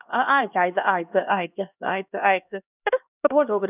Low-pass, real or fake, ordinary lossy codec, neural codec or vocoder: 3.6 kHz; fake; none; codec, 16 kHz, 1 kbps, X-Codec, HuBERT features, trained on LibriSpeech